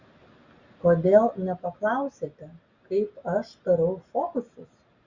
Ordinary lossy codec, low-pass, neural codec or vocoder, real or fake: Opus, 64 kbps; 7.2 kHz; none; real